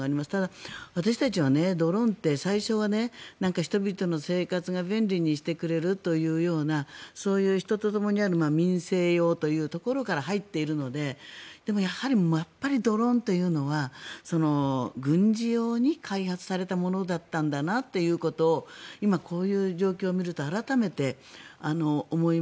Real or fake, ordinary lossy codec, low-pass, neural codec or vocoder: real; none; none; none